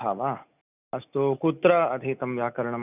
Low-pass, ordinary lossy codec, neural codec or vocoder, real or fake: 3.6 kHz; none; none; real